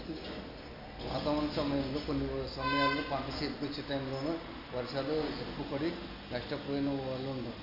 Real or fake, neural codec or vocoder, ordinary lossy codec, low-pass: real; none; AAC, 48 kbps; 5.4 kHz